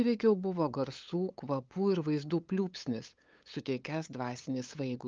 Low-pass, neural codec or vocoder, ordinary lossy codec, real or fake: 7.2 kHz; codec, 16 kHz, 8 kbps, FunCodec, trained on LibriTTS, 25 frames a second; Opus, 24 kbps; fake